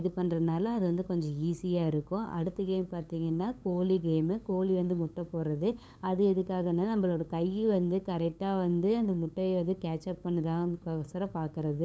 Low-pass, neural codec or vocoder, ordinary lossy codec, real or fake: none; codec, 16 kHz, 8 kbps, FunCodec, trained on LibriTTS, 25 frames a second; none; fake